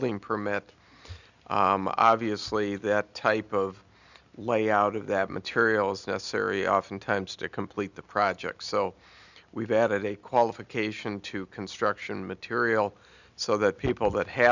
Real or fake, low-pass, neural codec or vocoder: real; 7.2 kHz; none